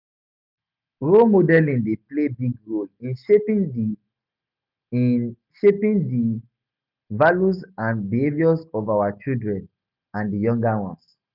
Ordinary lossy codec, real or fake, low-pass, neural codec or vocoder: none; real; 5.4 kHz; none